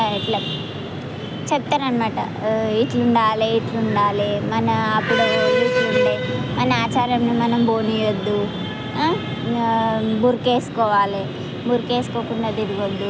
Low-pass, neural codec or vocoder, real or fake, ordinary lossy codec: none; none; real; none